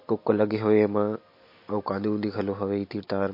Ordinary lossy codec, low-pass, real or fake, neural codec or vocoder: MP3, 32 kbps; 5.4 kHz; real; none